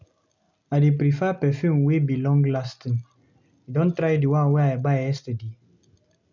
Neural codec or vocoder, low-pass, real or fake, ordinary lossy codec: none; 7.2 kHz; real; none